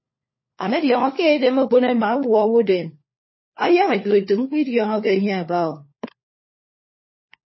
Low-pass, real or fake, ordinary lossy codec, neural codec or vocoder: 7.2 kHz; fake; MP3, 24 kbps; codec, 16 kHz, 4 kbps, FunCodec, trained on LibriTTS, 50 frames a second